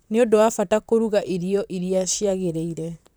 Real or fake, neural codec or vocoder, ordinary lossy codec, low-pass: fake; vocoder, 44.1 kHz, 128 mel bands, Pupu-Vocoder; none; none